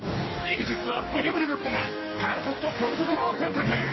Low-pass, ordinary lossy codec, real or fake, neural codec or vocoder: 7.2 kHz; MP3, 24 kbps; fake; codec, 44.1 kHz, 2.6 kbps, DAC